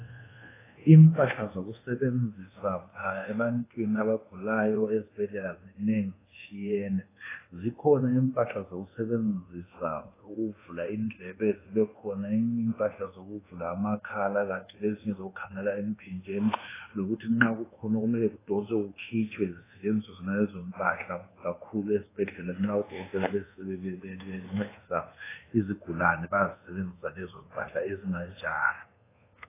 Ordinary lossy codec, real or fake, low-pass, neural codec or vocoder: AAC, 16 kbps; fake; 3.6 kHz; codec, 24 kHz, 1.2 kbps, DualCodec